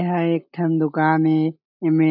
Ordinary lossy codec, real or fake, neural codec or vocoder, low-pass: none; real; none; 5.4 kHz